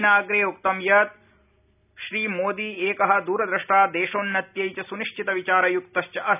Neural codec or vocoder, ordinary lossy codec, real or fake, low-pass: none; none; real; 3.6 kHz